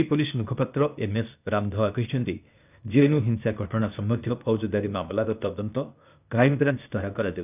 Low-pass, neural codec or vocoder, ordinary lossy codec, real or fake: 3.6 kHz; codec, 16 kHz, 0.8 kbps, ZipCodec; none; fake